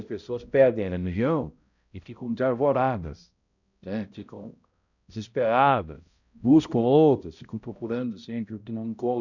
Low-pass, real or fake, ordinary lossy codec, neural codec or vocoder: 7.2 kHz; fake; none; codec, 16 kHz, 0.5 kbps, X-Codec, HuBERT features, trained on balanced general audio